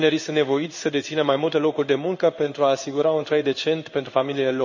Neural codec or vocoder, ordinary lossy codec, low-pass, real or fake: codec, 16 kHz in and 24 kHz out, 1 kbps, XY-Tokenizer; none; 7.2 kHz; fake